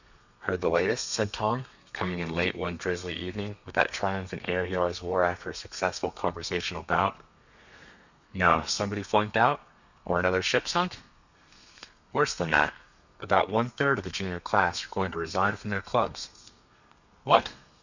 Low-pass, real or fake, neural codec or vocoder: 7.2 kHz; fake; codec, 32 kHz, 1.9 kbps, SNAC